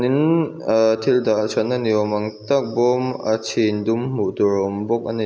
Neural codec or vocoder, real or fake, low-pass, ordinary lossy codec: none; real; none; none